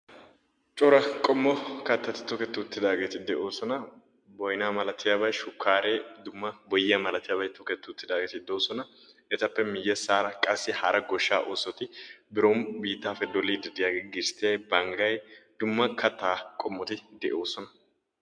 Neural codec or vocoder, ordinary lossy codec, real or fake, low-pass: none; MP3, 64 kbps; real; 9.9 kHz